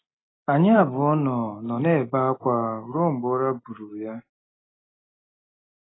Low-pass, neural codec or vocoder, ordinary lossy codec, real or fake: 7.2 kHz; none; AAC, 16 kbps; real